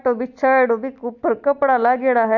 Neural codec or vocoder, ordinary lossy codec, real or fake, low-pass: none; none; real; 7.2 kHz